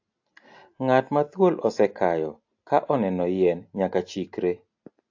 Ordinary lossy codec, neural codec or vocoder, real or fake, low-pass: AAC, 48 kbps; none; real; 7.2 kHz